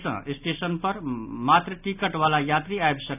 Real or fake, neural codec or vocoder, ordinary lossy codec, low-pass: real; none; none; 3.6 kHz